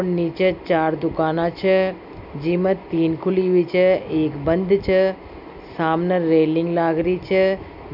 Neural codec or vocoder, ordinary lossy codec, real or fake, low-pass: none; none; real; 5.4 kHz